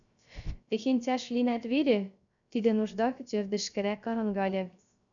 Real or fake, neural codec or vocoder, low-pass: fake; codec, 16 kHz, 0.3 kbps, FocalCodec; 7.2 kHz